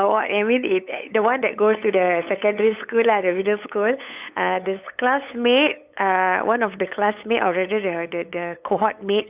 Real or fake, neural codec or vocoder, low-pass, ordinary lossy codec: fake; codec, 16 kHz, 8 kbps, FunCodec, trained on LibriTTS, 25 frames a second; 3.6 kHz; Opus, 64 kbps